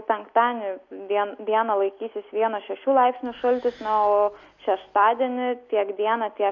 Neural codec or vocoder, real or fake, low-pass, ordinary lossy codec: none; real; 7.2 kHz; MP3, 64 kbps